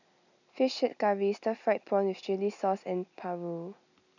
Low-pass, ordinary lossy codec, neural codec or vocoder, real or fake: 7.2 kHz; none; none; real